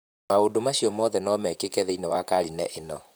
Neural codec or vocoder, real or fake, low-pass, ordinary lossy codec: vocoder, 44.1 kHz, 128 mel bands, Pupu-Vocoder; fake; none; none